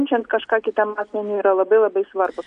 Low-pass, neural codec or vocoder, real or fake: 9.9 kHz; none; real